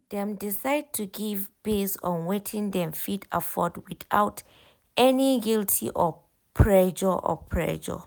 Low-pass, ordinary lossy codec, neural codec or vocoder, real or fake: none; none; none; real